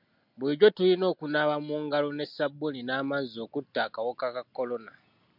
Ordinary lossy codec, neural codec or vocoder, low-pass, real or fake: MP3, 48 kbps; none; 5.4 kHz; real